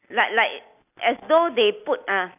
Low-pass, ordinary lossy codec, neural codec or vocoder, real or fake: 3.6 kHz; none; none; real